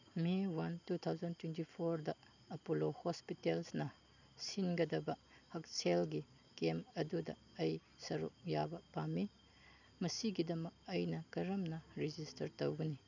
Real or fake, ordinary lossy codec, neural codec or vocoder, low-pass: real; none; none; 7.2 kHz